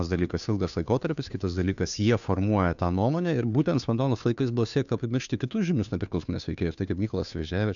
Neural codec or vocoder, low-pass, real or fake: codec, 16 kHz, 2 kbps, FunCodec, trained on Chinese and English, 25 frames a second; 7.2 kHz; fake